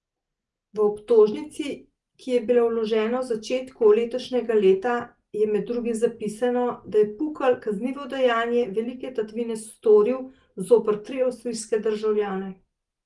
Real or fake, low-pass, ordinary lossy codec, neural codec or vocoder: real; 10.8 kHz; Opus, 24 kbps; none